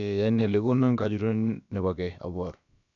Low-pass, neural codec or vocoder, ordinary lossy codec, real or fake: 7.2 kHz; codec, 16 kHz, about 1 kbps, DyCAST, with the encoder's durations; none; fake